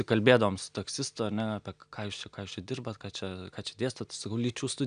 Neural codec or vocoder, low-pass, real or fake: none; 9.9 kHz; real